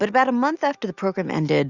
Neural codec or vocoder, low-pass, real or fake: none; 7.2 kHz; real